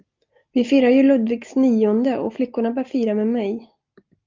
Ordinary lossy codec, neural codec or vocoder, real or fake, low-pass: Opus, 24 kbps; none; real; 7.2 kHz